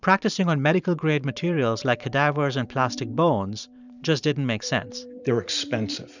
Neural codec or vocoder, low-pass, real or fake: vocoder, 44.1 kHz, 128 mel bands every 512 samples, BigVGAN v2; 7.2 kHz; fake